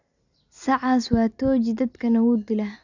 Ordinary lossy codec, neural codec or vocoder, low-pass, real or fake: none; none; 7.2 kHz; real